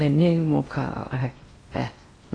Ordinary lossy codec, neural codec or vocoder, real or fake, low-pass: AAC, 32 kbps; codec, 16 kHz in and 24 kHz out, 0.8 kbps, FocalCodec, streaming, 65536 codes; fake; 9.9 kHz